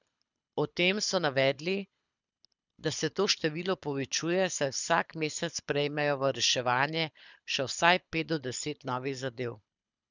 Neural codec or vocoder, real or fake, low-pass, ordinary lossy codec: codec, 24 kHz, 6 kbps, HILCodec; fake; 7.2 kHz; none